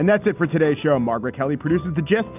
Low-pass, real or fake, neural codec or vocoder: 3.6 kHz; real; none